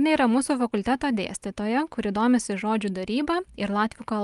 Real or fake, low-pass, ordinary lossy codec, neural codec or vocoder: real; 10.8 kHz; Opus, 32 kbps; none